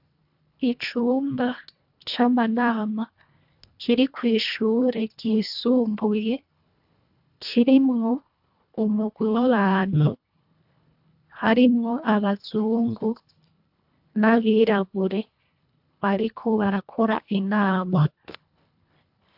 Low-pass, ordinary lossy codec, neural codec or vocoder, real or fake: 5.4 kHz; AAC, 48 kbps; codec, 24 kHz, 1.5 kbps, HILCodec; fake